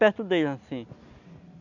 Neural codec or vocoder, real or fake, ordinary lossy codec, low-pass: none; real; none; 7.2 kHz